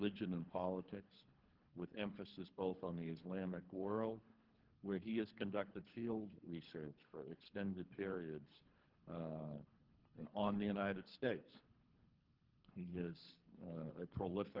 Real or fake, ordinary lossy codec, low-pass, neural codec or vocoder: fake; Opus, 16 kbps; 5.4 kHz; codec, 24 kHz, 3 kbps, HILCodec